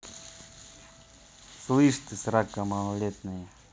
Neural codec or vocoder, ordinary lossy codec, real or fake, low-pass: none; none; real; none